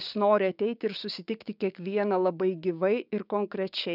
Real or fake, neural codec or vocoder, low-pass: fake; codec, 16 kHz, 6 kbps, DAC; 5.4 kHz